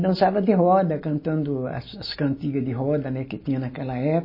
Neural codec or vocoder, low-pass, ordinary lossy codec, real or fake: none; 5.4 kHz; MP3, 24 kbps; real